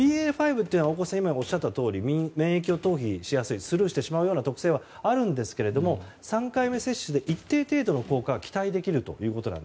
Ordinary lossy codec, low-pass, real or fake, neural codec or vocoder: none; none; real; none